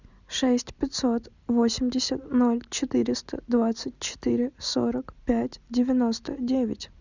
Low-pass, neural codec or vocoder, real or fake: 7.2 kHz; none; real